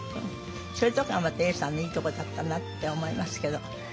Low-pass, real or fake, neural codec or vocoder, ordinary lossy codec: none; real; none; none